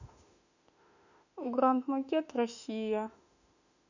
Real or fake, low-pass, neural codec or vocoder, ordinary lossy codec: fake; 7.2 kHz; autoencoder, 48 kHz, 32 numbers a frame, DAC-VAE, trained on Japanese speech; none